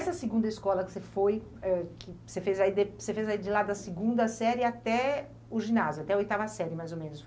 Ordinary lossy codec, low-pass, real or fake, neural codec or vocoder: none; none; real; none